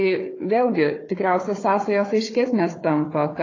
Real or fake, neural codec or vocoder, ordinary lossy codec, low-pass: fake; codec, 16 kHz, 4 kbps, FunCodec, trained on Chinese and English, 50 frames a second; AAC, 32 kbps; 7.2 kHz